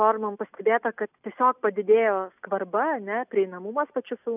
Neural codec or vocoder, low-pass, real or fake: none; 3.6 kHz; real